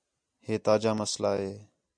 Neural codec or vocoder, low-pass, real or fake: none; 9.9 kHz; real